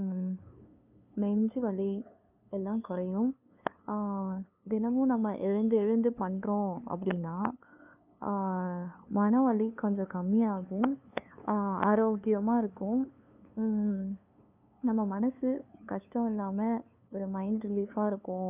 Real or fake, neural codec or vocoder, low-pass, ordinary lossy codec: fake; codec, 16 kHz, 2 kbps, FunCodec, trained on LibriTTS, 25 frames a second; 3.6 kHz; none